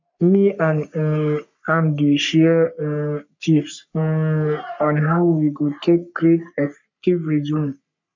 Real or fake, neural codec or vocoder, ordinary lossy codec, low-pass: fake; codec, 44.1 kHz, 3.4 kbps, Pupu-Codec; MP3, 64 kbps; 7.2 kHz